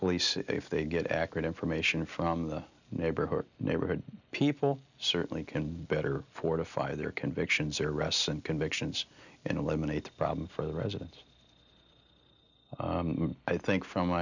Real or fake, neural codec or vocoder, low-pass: real; none; 7.2 kHz